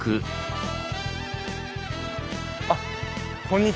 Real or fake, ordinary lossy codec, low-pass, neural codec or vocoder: real; none; none; none